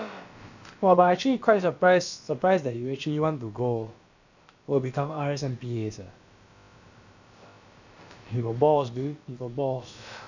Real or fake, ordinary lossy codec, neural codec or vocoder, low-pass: fake; none; codec, 16 kHz, about 1 kbps, DyCAST, with the encoder's durations; 7.2 kHz